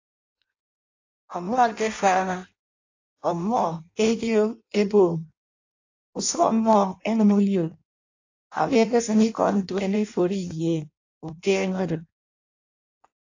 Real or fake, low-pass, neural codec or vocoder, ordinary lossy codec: fake; 7.2 kHz; codec, 16 kHz in and 24 kHz out, 0.6 kbps, FireRedTTS-2 codec; AAC, 48 kbps